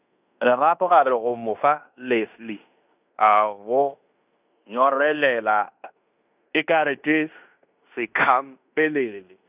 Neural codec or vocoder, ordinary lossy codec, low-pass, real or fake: codec, 16 kHz in and 24 kHz out, 0.9 kbps, LongCat-Audio-Codec, fine tuned four codebook decoder; none; 3.6 kHz; fake